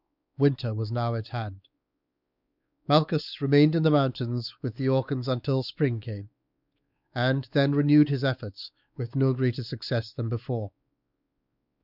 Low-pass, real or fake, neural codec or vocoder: 5.4 kHz; fake; codec, 16 kHz, 4 kbps, X-Codec, WavLM features, trained on Multilingual LibriSpeech